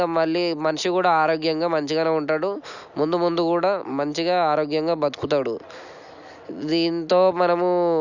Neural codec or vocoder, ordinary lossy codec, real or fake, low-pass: none; none; real; 7.2 kHz